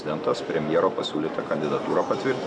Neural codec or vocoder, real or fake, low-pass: none; real; 9.9 kHz